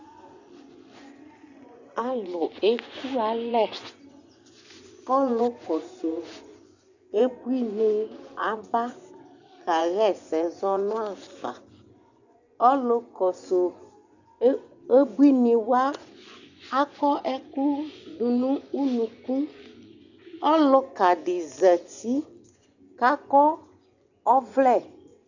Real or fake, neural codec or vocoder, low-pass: fake; vocoder, 24 kHz, 100 mel bands, Vocos; 7.2 kHz